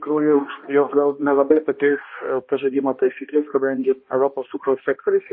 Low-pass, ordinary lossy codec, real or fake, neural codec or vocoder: 7.2 kHz; MP3, 24 kbps; fake; codec, 16 kHz, 1 kbps, X-Codec, HuBERT features, trained on balanced general audio